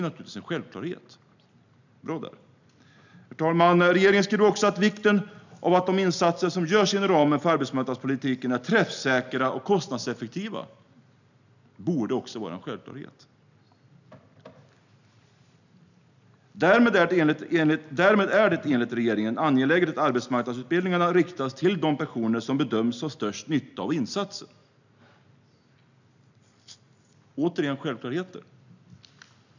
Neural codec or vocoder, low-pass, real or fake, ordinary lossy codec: none; 7.2 kHz; real; none